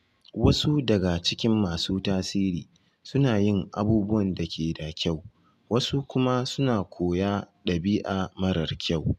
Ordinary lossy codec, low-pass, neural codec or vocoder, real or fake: MP3, 96 kbps; 14.4 kHz; none; real